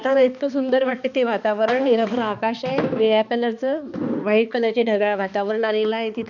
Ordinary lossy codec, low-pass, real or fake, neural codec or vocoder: none; 7.2 kHz; fake; codec, 16 kHz, 2 kbps, X-Codec, HuBERT features, trained on balanced general audio